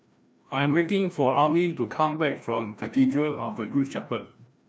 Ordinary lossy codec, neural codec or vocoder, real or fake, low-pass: none; codec, 16 kHz, 1 kbps, FreqCodec, larger model; fake; none